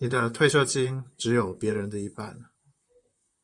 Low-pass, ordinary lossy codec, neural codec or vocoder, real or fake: 10.8 kHz; Opus, 64 kbps; vocoder, 44.1 kHz, 128 mel bands, Pupu-Vocoder; fake